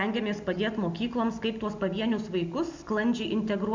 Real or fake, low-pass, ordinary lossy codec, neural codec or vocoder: real; 7.2 kHz; MP3, 64 kbps; none